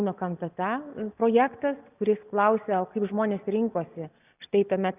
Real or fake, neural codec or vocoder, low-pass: real; none; 3.6 kHz